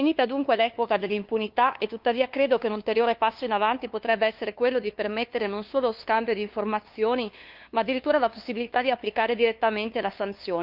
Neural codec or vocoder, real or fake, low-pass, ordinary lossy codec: codec, 16 kHz, 2 kbps, FunCodec, trained on LibriTTS, 25 frames a second; fake; 5.4 kHz; Opus, 24 kbps